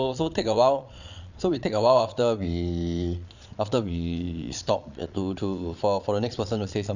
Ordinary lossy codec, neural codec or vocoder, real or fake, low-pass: none; vocoder, 22.05 kHz, 80 mel bands, Vocos; fake; 7.2 kHz